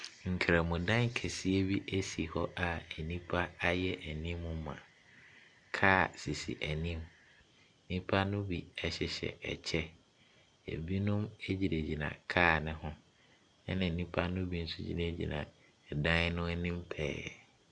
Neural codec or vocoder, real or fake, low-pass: none; real; 9.9 kHz